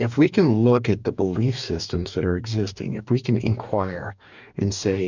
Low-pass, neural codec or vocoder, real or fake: 7.2 kHz; codec, 44.1 kHz, 2.6 kbps, DAC; fake